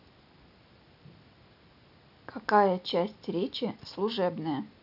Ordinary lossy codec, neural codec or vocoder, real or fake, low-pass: none; none; real; 5.4 kHz